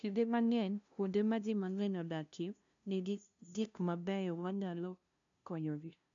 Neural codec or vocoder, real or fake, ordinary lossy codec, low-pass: codec, 16 kHz, 0.5 kbps, FunCodec, trained on LibriTTS, 25 frames a second; fake; none; 7.2 kHz